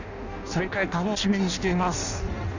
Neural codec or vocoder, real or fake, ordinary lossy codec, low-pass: codec, 16 kHz in and 24 kHz out, 0.6 kbps, FireRedTTS-2 codec; fake; none; 7.2 kHz